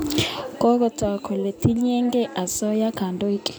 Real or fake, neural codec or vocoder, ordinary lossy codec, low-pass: real; none; none; none